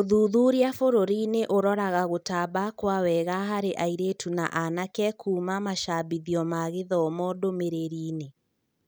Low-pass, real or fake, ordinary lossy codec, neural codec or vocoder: none; real; none; none